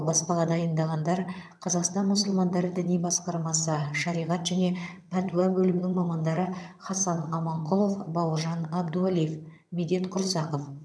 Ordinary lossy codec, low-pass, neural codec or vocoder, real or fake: none; none; vocoder, 22.05 kHz, 80 mel bands, HiFi-GAN; fake